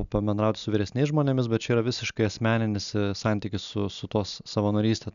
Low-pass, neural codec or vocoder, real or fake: 7.2 kHz; none; real